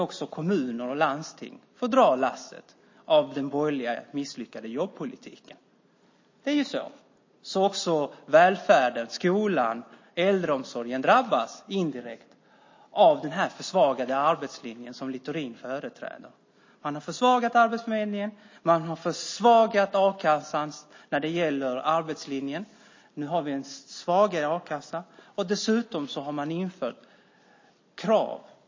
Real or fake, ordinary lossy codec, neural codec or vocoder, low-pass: real; MP3, 32 kbps; none; 7.2 kHz